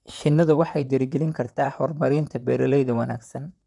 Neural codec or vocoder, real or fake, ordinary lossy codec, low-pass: vocoder, 44.1 kHz, 128 mel bands, Pupu-Vocoder; fake; none; 10.8 kHz